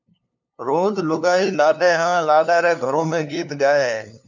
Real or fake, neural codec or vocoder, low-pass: fake; codec, 16 kHz, 2 kbps, FunCodec, trained on LibriTTS, 25 frames a second; 7.2 kHz